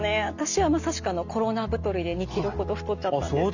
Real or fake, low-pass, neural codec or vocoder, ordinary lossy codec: real; 7.2 kHz; none; none